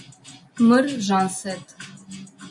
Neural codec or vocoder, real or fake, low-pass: none; real; 10.8 kHz